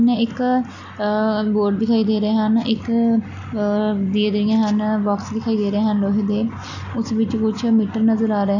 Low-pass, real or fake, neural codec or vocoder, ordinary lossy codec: 7.2 kHz; real; none; none